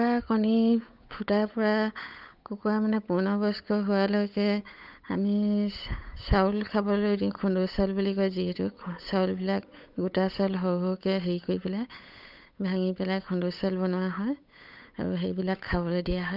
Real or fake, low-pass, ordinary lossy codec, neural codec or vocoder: fake; 5.4 kHz; none; codec, 16 kHz, 8 kbps, FunCodec, trained on Chinese and English, 25 frames a second